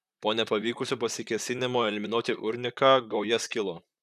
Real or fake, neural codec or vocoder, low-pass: fake; vocoder, 44.1 kHz, 128 mel bands, Pupu-Vocoder; 14.4 kHz